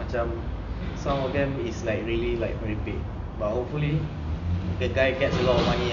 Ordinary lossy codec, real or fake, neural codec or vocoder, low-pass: none; real; none; 7.2 kHz